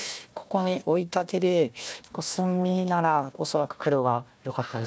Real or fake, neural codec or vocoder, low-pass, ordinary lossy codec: fake; codec, 16 kHz, 1 kbps, FunCodec, trained on Chinese and English, 50 frames a second; none; none